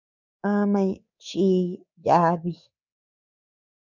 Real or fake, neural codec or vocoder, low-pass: fake; codec, 24 kHz, 3.1 kbps, DualCodec; 7.2 kHz